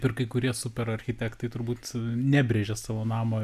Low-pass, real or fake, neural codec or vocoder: 14.4 kHz; real; none